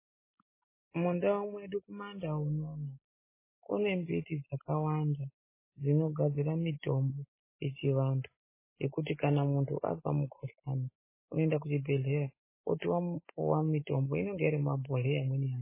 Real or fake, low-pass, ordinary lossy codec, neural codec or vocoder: real; 3.6 kHz; MP3, 16 kbps; none